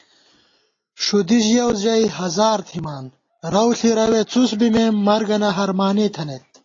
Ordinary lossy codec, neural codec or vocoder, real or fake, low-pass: AAC, 32 kbps; none; real; 7.2 kHz